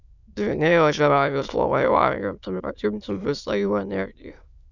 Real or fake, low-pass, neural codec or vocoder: fake; 7.2 kHz; autoencoder, 22.05 kHz, a latent of 192 numbers a frame, VITS, trained on many speakers